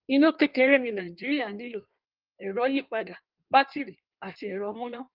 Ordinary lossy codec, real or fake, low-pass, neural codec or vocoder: Opus, 32 kbps; fake; 5.4 kHz; codec, 16 kHz in and 24 kHz out, 1.1 kbps, FireRedTTS-2 codec